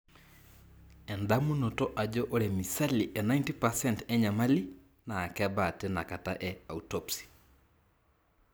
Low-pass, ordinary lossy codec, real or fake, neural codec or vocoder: none; none; real; none